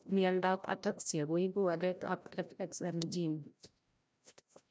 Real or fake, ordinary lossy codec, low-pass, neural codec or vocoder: fake; none; none; codec, 16 kHz, 0.5 kbps, FreqCodec, larger model